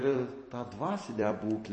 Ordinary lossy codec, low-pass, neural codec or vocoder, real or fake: MP3, 32 kbps; 10.8 kHz; vocoder, 44.1 kHz, 128 mel bands every 256 samples, BigVGAN v2; fake